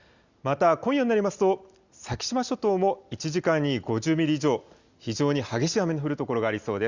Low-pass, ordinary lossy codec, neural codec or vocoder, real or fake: 7.2 kHz; Opus, 64 kbps; none; real